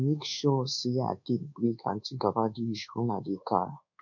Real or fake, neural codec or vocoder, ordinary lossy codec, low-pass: fake; codec, 24 kHz, 1.2 kbps, DualCodec; none; 7.2 kHz